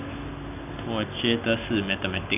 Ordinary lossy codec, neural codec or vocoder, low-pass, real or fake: none; none; 3.6 kHz; real